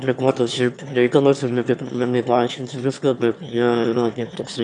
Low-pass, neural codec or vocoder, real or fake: 9.9 kHz; autoencoder, 22.05 kHz, a latent of 192 numbers a frame, VITS, trained on one speaker; fake